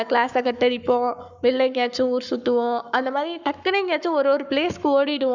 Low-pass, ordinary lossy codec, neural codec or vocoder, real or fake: 7.2 kHz; none; codec, 44.1 kHz, 7.8 kbps, Pupu-Codec; fake